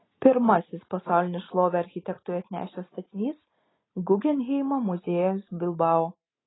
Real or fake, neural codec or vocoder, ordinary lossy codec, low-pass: real; none; AAC, 16 kbps; 7.2 kHz